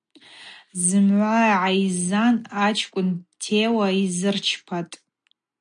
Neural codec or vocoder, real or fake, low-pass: none; real; 9.9 kHz